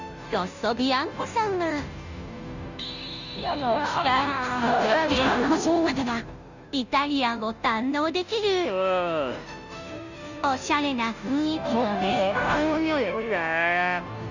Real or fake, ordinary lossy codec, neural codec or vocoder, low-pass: fake; none; codec, 16 kHz, 0.5 kbps, FunCodec, trained on Chinese and English, 25 frames a second; 7.2 kHz